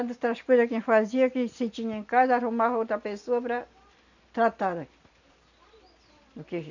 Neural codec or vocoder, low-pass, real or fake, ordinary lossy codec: none; 7.2 kHz; real; none